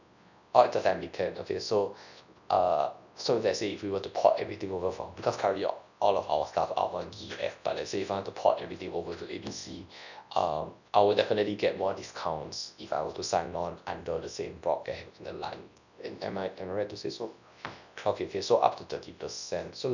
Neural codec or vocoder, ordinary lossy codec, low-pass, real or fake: codec, 24 kHz, 0.9 kbps, WavTokenizer, large speech release; none; 7.2 kHz; fake